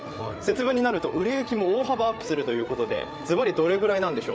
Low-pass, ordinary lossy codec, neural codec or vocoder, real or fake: none; none; codec, 16 kHz, 8 kbps, FreqCodec, larger model; fake